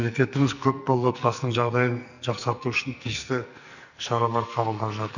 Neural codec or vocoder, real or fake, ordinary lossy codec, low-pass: codec, 44.1 kHz, 2.6 kbps, SNAC; fake; none; 7.2 kHz